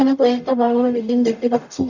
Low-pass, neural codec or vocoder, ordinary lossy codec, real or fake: 7.2 kHz; codec, 44.1 kHz, 0.9 kbps, DAC; none; fake